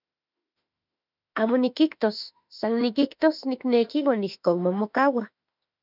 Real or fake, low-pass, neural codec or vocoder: fake; 5.4 kHz; autoencoder, 48 kHz, 32 numbers a frame, DAC-VAE, trained on Japanese speech